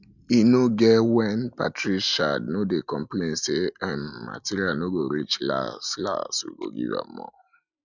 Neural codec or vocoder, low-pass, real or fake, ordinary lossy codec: none; 7.2 kHz; real; none